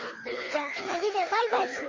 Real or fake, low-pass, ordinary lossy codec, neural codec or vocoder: fake; 7.2 kHz; MP3, 32 kbps; codec, 24 kHz, 3 kbps, HILCodec